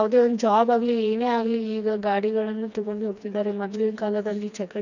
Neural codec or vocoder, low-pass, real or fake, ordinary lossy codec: codec, 16 kHz, 2 kbps, FreqCodec, smaller model; 7.2 kHz; fake; none